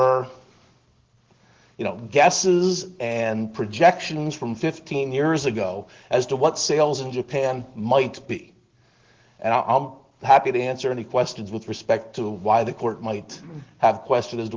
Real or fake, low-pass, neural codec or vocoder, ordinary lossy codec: real; 7.2 kHz; none; Opus, 16 kbps